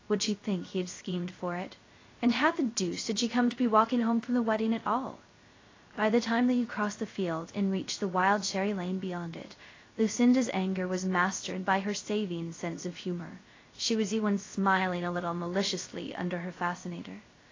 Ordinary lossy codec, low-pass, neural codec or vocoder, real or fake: AAC, 32 kbps; 7.2 kHz; codec, 16 kHz, 0.3 kbps, FocalCodec; fake